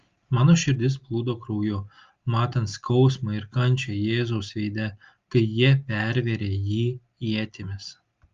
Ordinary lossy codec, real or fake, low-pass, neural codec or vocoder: Opus, 32 kbps; real; 7.2 kHz; none